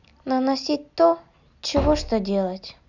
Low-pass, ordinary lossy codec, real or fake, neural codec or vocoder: 7.2 kHz; none; real; none